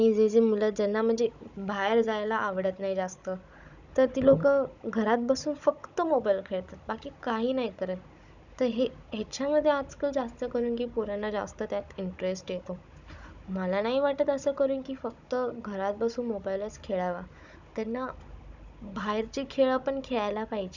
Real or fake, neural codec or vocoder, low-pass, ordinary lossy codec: fake; codec, 16 kHz, 16 kbps, FunCodec, trained on Chinese and English, 50 frames a second; 7.2 kHz; none